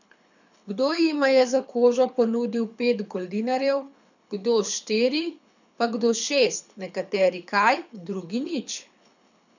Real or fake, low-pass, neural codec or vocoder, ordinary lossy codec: fake; 7.2 kHz; codec, 24 kHz, 6 kbps, HILCodec; none